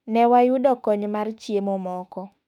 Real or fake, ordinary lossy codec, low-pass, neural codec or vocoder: fake; none; 19.8 kHz; autoencoder, 48 kHz, 32 numbers a frame, DAC-VAE, trained on Japanese speech